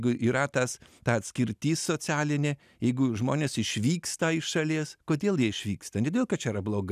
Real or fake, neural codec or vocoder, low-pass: real; none; 14.4 kHz